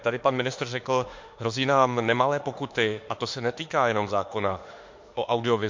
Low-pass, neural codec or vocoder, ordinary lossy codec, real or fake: 7.2 kHz; autoencoder, 48 kHz, 32 numbers a frame, DAC-VAE, trained on Japanese speech; MP3, 48 kbps; fake